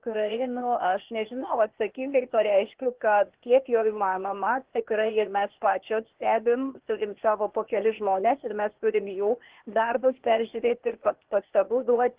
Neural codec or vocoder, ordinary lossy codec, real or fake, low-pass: codec, 16 kHz, 0.8 kbps, ZipCodec; Opus, 16 kbps; fake; 3.6 kHz